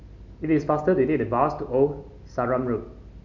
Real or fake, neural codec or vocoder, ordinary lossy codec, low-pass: real; none; MP3, 48 kbps; 7.2 kHz